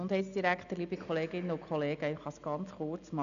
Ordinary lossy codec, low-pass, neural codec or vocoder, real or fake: none; 7.2 kHz; none; real